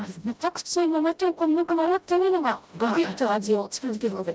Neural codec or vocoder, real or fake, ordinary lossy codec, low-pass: codec, 16 kHz, 0.5 kbps, FreqCodec, smaller model; fake; none; none